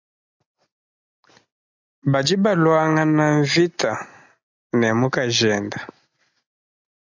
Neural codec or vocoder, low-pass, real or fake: none; 7.2 kHz; real